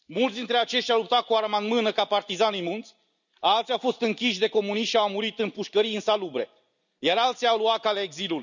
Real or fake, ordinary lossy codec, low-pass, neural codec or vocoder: real; none; 7.2 kHz; none